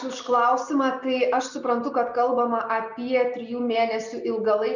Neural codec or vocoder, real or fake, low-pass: none; real; 7.2 kHz